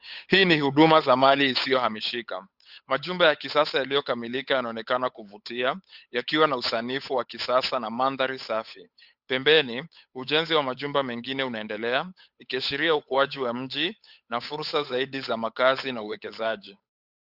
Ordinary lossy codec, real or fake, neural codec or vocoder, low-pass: Opus, 64 kbps; fake; codec, 16 kHz, 8 kbps, FunCodec, trained on Chinese and English, 25 frames a second; 5.4 kHz